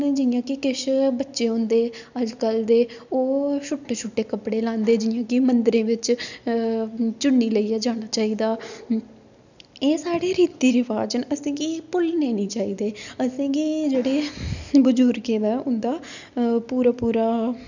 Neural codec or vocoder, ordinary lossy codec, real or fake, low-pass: none; none; real; 7.2 kHz